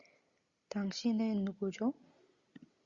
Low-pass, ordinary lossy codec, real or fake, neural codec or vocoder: 7.2 kHz; Opus, 64 kbps; real; none